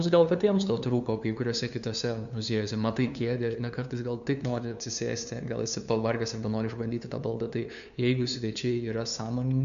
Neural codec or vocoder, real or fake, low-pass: codec, 16 kHz, 2 kbps, FunCodec, trained on LibriTTS, 25 frames a second; fake; 7.2 kHz